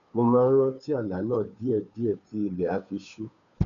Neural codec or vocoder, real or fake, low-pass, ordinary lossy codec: codec, 16 kHz, 2 kbps, FunCodec, trained on Chinese and English, 25 frames a second; fake; 7.2 kHz; none